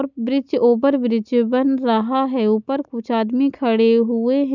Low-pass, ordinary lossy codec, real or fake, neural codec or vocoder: 7.2 kHz; none; real; none